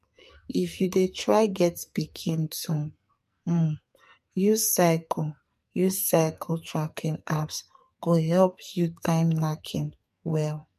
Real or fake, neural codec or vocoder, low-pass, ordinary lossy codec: fake; codec, 44.1 kHz, 2.6 kbps, SNAC; 14.4 kHz; MP3, 64 kbps